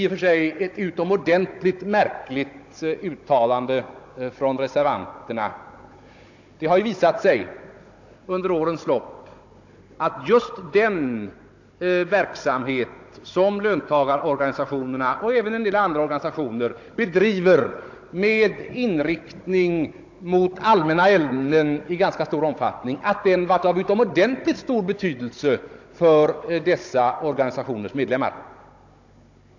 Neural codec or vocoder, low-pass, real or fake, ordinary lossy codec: codec, 16 kHz, 16 kbps, FunCodec, trained on Chinese and English, 50 frames a second; 7.2 kHz; fake; AAC, 48 kbps